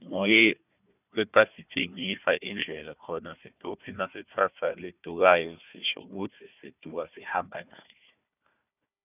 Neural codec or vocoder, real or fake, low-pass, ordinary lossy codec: codec, 16 kHz, 1 kbps, FunCodec, trained on Chinese and English, 50 frames a second; fake; 3.6 kHz; none